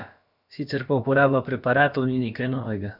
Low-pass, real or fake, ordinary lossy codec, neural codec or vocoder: 5.4 kHz; fake; none; codec, 16 kHz, about 1 kbps, DyCAST, with the encoder's durations